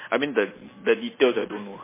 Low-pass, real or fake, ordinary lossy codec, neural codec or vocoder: 3.6 kHz; real; MP3, 16 kbps; none